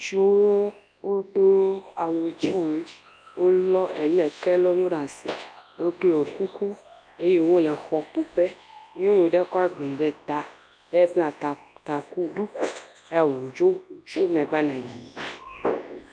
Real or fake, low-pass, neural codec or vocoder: fake; 9.9 kHz; codec, 24 kHz, 0.9 kbps, WavTokenizer, large speech release